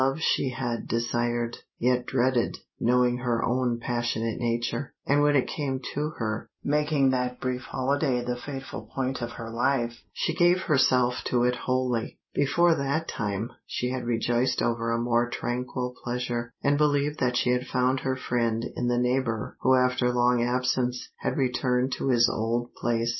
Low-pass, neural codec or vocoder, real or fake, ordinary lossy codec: 7.2 kHz; none; real; MP3, 24 kbps